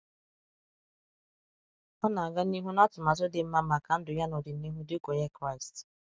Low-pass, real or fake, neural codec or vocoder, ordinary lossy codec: none; real; none; none